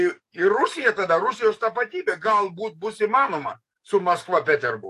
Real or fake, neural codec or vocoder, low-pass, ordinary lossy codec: fake; codec, 44.1 kHz, 7.8 kbps, DAC; 14.4 kHz; AAC, 64 kbps